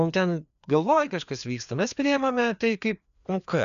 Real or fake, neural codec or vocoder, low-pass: fake; codec, 16 kHz, 2 kbps, FreqCodec, larger model; 7.2 kHz